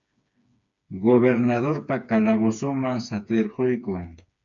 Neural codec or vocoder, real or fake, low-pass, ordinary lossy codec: codec, 16 kHz, 4 kbps, FreqCodec, smaller model; fake; 7.2 kHz; MP3, 64 kbps